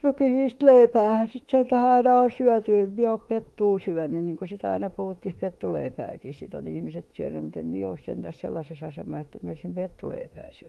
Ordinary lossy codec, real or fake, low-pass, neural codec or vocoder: Opus, 24 kbps; fake; 19.8 kHz; autoencoder, 48 kHz, 32 numbers a frame, DAC-VAE, trained on Japanese speech